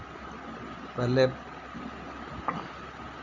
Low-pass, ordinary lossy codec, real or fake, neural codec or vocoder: 7.2 kHz; none; real; none